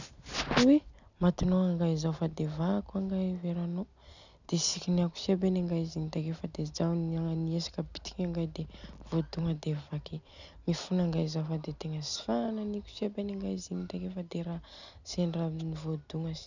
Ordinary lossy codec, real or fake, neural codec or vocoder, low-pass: none; real; none; 7.2 kHz